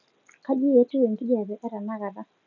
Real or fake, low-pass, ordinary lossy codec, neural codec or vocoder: real; 7.2 kHz; none; none